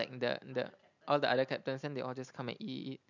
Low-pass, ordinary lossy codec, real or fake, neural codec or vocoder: 7.2 kHz; none; real; none